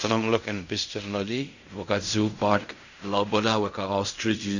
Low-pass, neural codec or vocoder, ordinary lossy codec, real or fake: 7.2 kHz; codec, 16 kHz in and 24 kHz out, 0.4 kbps, LongCat-Audio-Codec, fine tuned four codebook decoder; none; fake